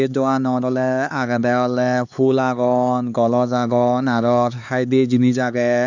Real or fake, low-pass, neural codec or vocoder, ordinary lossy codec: fake; 7.2 kHz; codec, 16 kHz, 4 kbps, X-Codec, HuBERT features, trained on LibriSpeech; none